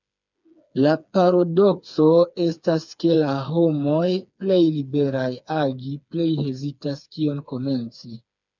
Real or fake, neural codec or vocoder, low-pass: fake; codec, 16 kHz, 4 kbps, FreqCodec, smaller model; 7.2 kHz